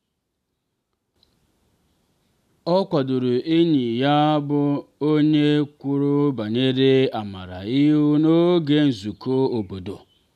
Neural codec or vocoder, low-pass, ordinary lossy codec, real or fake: none; 14.4 kHz; none; real